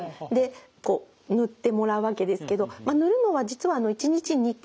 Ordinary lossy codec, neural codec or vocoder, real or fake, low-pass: none; none; real; none